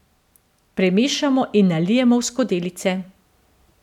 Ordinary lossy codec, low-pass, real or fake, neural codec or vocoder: none; 19.8 kHz; real; none